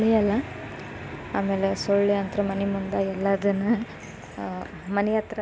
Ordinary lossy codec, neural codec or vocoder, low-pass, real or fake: none; none; none; real